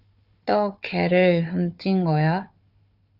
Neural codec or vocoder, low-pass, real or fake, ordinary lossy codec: codec, 16 kHz, 4 kbps, FunCodec, trained on Chinese and English, 50 frames a second; 5.4 kHz; fake; Opus, 64 kbps